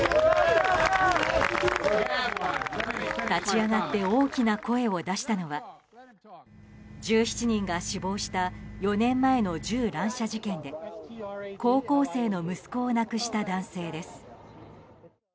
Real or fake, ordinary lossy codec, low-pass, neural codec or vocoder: real; none; none; none